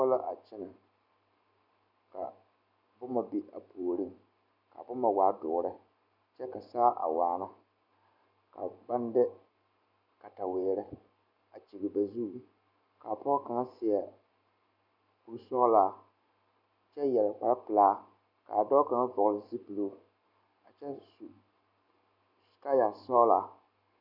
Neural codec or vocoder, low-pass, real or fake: none; 5.4 kHz; real